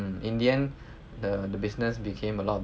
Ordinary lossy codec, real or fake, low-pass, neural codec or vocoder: none; real; none; none